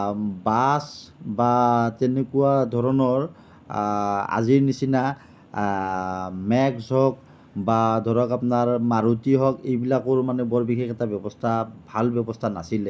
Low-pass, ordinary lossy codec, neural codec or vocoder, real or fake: none; none; none; real